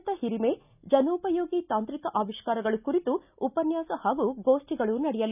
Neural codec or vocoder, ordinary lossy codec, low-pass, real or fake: none; none; 3.6 kHz; real